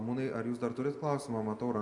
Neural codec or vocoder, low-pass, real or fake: none; 10.8 kHz; real